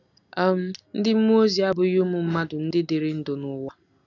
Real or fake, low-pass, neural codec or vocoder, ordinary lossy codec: real; 7.2 kHz; none; none